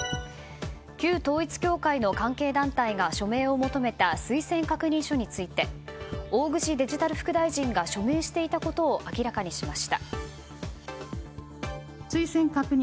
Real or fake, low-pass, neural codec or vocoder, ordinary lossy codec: real; none; none; none